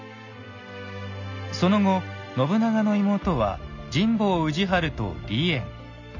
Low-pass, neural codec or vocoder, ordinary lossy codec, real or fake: 7.2 kHz; none; none; real